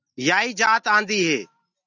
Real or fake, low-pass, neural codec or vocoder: real; 7.2 kHz; none